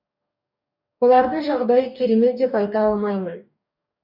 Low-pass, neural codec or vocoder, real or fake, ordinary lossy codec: 5.4 kHz; codec, 44.1 kHz, 2.6 kbps, DAC; fake; none